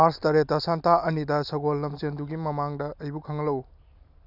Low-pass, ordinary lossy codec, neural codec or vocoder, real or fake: 5.4 kHz; none; none; real